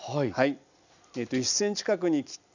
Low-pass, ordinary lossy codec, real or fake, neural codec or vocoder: 7.2 kHz; none; real; none